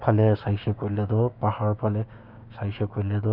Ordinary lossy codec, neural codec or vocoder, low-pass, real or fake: none; codec, 16 kHz, 6 kbps, DAC; 5.4 kHz; fake